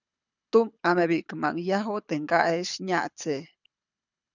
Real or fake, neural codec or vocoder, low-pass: fake; codec, 24 kHz, 6 kbps, HILCodec; 7.2 kHz